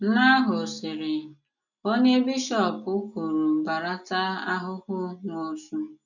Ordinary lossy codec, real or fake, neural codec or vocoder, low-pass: none; real; none; 7.2 kHz